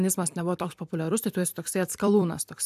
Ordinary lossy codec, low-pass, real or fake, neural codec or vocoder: AAC, 96 kbps; 14.4 kHz; fake; vocoder, 44.1 kHz, 128 mel bands every 256 samples, BigVGAN v2